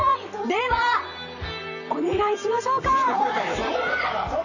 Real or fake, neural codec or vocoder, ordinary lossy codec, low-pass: fake; vocoder, 44.1 kHz, 128 mel bands, Pupu-Vocoder; none; 7.2 kHz